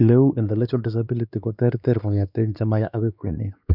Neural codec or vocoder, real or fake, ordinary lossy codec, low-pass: codec, 16 kHz, 2 kbps, X-Codec, WavLM features, trained on Multilingual LibriSpeech; fake; none; 5.4 kHz